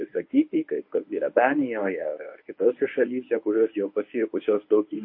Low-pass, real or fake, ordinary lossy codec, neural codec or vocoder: 5.4 kHz; fake; MP3, 32 kbps; codec, 24 kHz, 0.9 kbps, WavTokenizer, medium speech release version 1